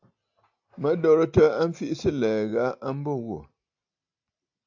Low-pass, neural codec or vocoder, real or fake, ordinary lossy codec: 7.2 kHz; none; real; AAC, 48 kbps